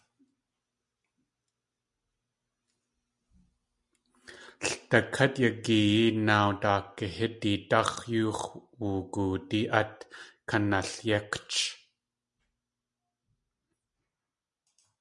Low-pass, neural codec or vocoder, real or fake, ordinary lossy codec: 10.8 kHz; none; real; MP3, 96 kbps